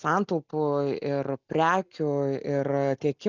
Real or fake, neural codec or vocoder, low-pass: real; none; 7.2 kHz